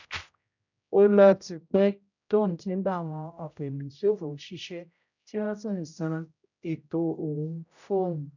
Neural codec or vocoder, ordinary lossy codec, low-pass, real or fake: codec, 16 kHz, 0.5 kbps, X-Codec, HuBERT features, trained on general audio; none; 7.2 kHz; fake